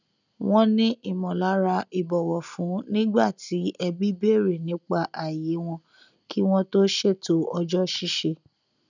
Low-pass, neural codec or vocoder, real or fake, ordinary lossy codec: 7.2 kHz; none; real; none